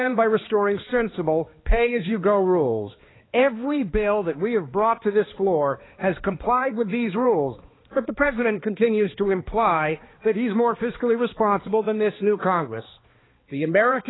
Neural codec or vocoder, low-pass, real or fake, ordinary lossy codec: codec, 16 kHz, 2 kbps, X-Codec, HuBERT features, trained on balanced general audio; 7.2 kHz; fake; AAC, 16 kbps